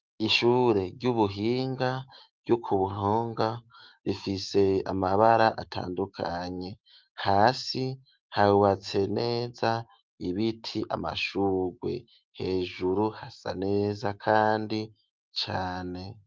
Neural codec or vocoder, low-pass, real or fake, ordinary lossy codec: autoencoder, 48 kHz, 128 numbers a frame, DAC-VAE, trained on Japanese speech; 7.2 kHz; fake; Opus, 32 kbps